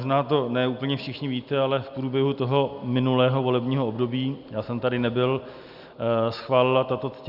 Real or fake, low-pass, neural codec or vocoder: real; 5.4 kHz; none